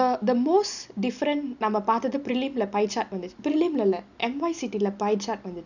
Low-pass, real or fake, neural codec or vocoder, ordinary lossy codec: 7.2 kHz; real; none; none